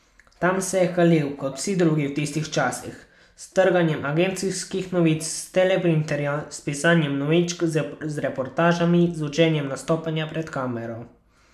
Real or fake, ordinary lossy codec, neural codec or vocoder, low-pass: real; none; none; 14.4 kHz